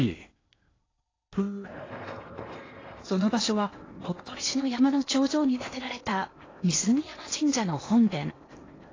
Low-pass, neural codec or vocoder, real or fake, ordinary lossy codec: 7.2 kHz; codec, 16 kHz in and 24 kHz out, 0.8 kbps, FocalCodec, streaming, 65536 codes; fake; AAC, 32 kbps